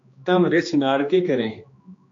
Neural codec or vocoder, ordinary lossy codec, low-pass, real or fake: codec, 16 kHz, 2 kbps, X-Codec, HuBERT features, trained on general audio; AAC, 48 kbps; 7.2 kHz; fake